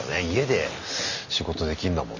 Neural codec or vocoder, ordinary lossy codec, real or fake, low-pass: none; none; real; 7.2 kHz